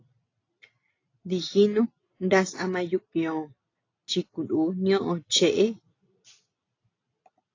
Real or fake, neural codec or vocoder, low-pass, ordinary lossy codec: real; none; 7.2 kHz; AAC, 32 kbps